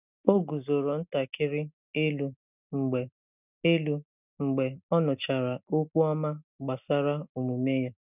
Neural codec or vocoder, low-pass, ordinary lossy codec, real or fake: none; 3.6 kHz; none; real